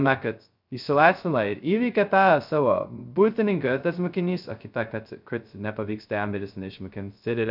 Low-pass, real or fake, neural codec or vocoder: 5.4 kHz; fake; codec, 16 kHz, 0.2 kbps, FocalCodec